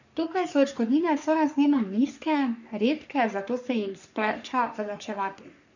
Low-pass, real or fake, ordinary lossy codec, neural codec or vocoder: 7.2 kHz; fake; none; codec, 44.1 kHz, 3.4 kbps, Pupu-Codec